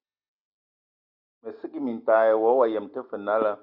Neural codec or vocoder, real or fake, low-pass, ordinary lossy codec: none; real; 5.4 kHz; Opus, 64 kbps